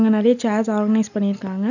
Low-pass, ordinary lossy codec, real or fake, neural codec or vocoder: 7.2 kHz; none; real; none